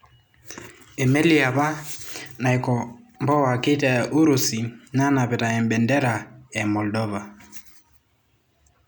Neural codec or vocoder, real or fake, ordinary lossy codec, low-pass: none; real; none; none